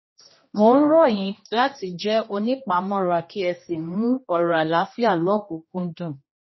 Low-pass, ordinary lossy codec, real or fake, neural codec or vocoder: 7.2 kHz; MP3, 24 kbps; fake; codec, 16 kHz, 1 kbps, X-Codec, HuBERT features, trained on general audio